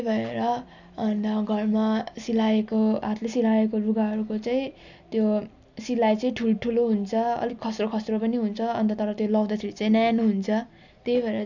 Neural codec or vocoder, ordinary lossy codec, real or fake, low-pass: none; none; real; 7.2 kHz